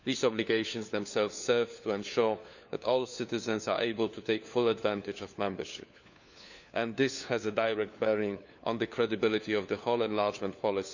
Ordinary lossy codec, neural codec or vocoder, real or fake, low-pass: none; codec, 16 kHz, 4 kbps, FunCodec, trained on LibriTTS, 50 frames a second; fake; 7.2 kHz